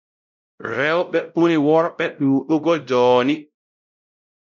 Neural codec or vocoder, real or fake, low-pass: codec, 16 kHz, 0.5 kbps, X-Codec, WavLM features, trained on Multilingual LibriSpeech; fake; 7.2 kHz